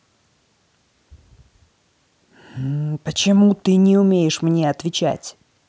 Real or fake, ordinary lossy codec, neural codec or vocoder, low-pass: real; none; none; none